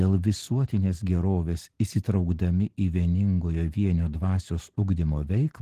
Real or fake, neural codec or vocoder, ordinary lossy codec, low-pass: real; none; Opus, 16 kbps; 14.4 kHz